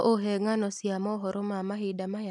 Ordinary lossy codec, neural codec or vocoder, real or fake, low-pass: none; none; real; 10.8 kHz